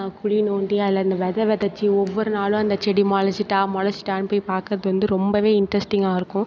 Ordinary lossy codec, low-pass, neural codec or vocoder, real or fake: none; none; none; real